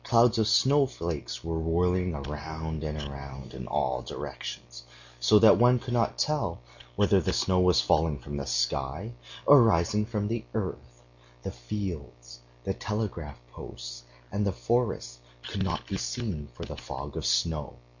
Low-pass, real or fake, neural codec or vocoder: 7.2 kHz; real; none